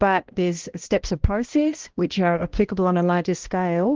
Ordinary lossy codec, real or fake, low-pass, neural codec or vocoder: Opus, 16 kbps; fake; 7.2 kHz; codec, 16 kHz, 1 kbps, X-Codec, HuBERT features, trained on balanced general audio